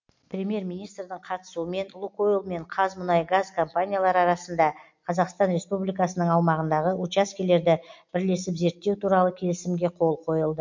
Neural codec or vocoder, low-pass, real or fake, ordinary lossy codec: none; 7.2 kHz; real; MP3, 48 kbps